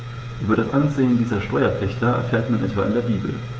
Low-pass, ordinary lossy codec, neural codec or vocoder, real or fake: none; none; codec, 16 kHz, 16 kbps, FreqCodec, smaller model; fake